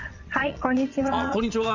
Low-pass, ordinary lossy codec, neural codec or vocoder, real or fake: 7.2 kHz; none; vocoder, 22.05 kHz, 80 mel bands, Vocos; fake